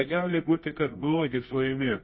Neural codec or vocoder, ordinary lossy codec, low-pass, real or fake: codec, 24 kHz, 0.9 kbps, WavTokenizer, medium music audio release; MP3, 24 kbps; 7.2 kHz; fake